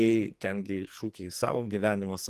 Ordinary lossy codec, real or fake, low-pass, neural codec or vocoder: Opus, 24 kbps; fake; 14.4 kHz; codec, 44.1 kHz, 2.6 kbps, SNAC